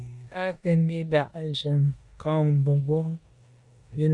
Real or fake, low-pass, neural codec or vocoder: fake; 10.8 kHz; codec, 16 kHz in and 24 kHz out, 0.9 kbps, LongCat-Audio-Codec, four codebook decoder